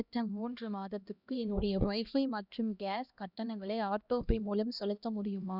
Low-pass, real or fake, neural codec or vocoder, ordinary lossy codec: 5.4 kHz; fake; codec, 16 kHz, 1 kbps, X-Codec, HuBERT features, trained on LibriSpeech; none